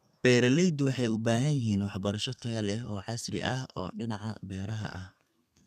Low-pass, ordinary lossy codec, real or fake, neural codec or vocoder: 14.4 kHz; none; fake; codec, 32 kHz, 1.9 kbps, SNAC